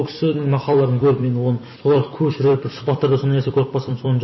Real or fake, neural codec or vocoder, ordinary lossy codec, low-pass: fake; vocoder, 44.1 kHz, 128 mel bands every 256 samples, BigVGAN v2; MP3, 24 kbps; 7.2 kHz